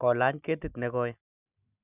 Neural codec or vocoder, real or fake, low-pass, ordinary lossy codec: none; real; 3.6 kHz; none